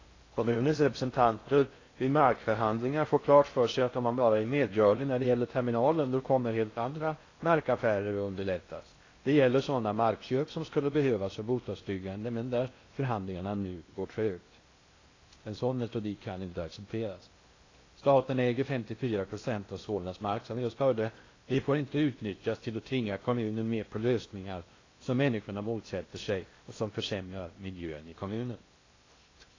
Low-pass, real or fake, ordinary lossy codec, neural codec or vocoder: 7.2 kHz; fake; AAC, 32 kbps; codec, 16 kHz in and 24 kHz out, 0.6 kbps, FocalCodec, streaming, 4096 codes